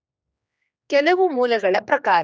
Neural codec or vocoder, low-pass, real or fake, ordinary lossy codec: codec, 16 kHz, 2 kbps, X-Codec, HuBERT features, trained on general audio; none; fake; none